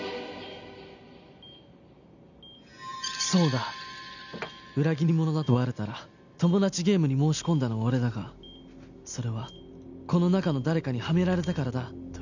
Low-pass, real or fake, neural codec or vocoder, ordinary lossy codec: 7.2 kHz; real; none; none